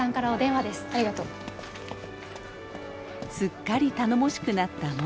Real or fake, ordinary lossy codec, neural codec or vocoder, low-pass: real; none; none; none